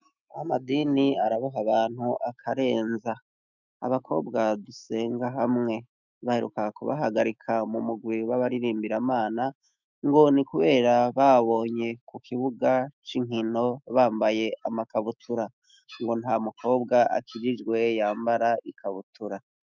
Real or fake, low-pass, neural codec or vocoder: fake; 7.2 kHz; autoencoder, 48 kHz, 128 numbers a frame, DAC-VAE, trained on Japanese speech